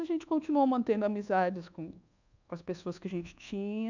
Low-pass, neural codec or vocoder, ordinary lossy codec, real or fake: 7.2 kHz; codec, 24 kHz, 1.2 kbps, DualCodec; none; fake